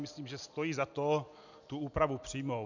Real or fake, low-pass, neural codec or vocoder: real; 7.2 kHz; none